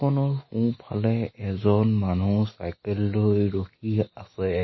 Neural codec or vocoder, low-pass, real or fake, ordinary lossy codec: none; 7.2 kHz; real; MP3, 24 kbps